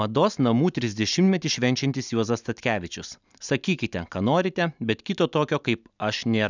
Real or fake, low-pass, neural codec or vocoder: real; 7.2 kHz; none